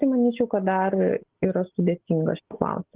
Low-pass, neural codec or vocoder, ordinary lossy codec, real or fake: 3.6 kHz; none; Opus, 16 kbps; real